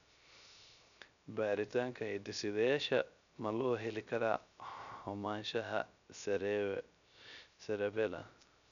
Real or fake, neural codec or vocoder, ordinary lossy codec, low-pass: fake; codec, 16 kHz, 0.3 kbps, FocalCodec; none; 7.2 kHz